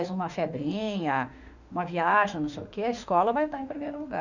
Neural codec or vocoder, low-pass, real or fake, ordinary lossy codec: autoencoder, 48 kHz, 32 numbers a frame, DAC-VAE, trained on Japanese speech; 7.2 kHz; fake; none